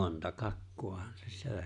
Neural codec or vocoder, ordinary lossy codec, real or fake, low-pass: none; none; real; 10.8 kHz